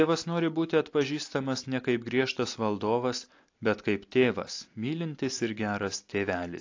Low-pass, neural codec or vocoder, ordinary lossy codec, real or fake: 7.2 kHz; none; AAC, 48 kbps; real